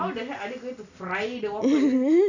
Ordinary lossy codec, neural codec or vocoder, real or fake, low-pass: none; none; real; 7.2 kHz